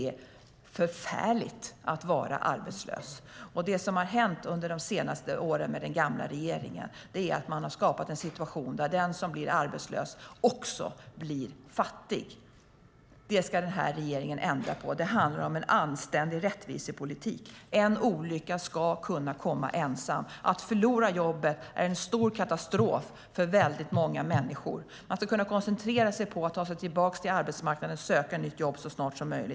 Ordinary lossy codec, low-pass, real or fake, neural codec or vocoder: none; none; real; none